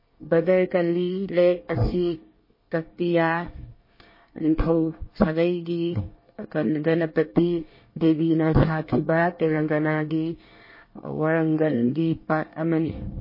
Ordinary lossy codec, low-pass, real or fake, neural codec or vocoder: MP3, 24 kbps; 5.4 kHz; fake; codec, 24 kHz, 1 kbps, SNAC